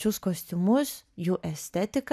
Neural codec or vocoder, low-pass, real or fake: none; 14.4 kHz; real